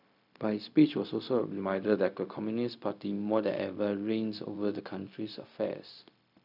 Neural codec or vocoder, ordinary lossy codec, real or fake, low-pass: codec, 16 kHz, 0.4 kbps, LongCat-Audio-Codec; none; fake; 5.4 kHz